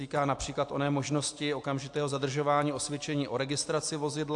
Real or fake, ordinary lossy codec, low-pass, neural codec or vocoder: real; AAC, 64 kbps; 10.8 kHz; none